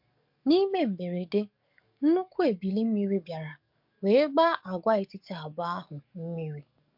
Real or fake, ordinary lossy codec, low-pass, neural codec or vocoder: fake; MP3, 48 kbps; 5.4 kHz; codec, 44.1 kHz, 7.8 kbps, DAC